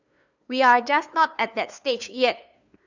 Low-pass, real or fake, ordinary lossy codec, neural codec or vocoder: 7.2 kHz; fake; none; codec, 16 kHz, 2 kbps, FunCodec, trained on LibriTTS, 25 frames a second